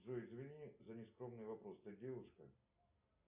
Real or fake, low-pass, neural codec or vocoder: real; 3.6 kHz; none